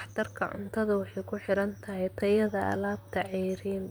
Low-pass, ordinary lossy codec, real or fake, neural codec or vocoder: none; none; fake; vocoder, 44.1 kHz, 128 mel bands, Pupu-Vocoder